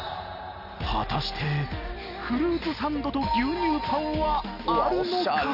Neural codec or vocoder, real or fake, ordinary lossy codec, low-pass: none; real; none; 5.4 kHz